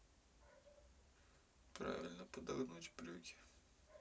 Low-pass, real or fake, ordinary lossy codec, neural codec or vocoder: none; real; none; none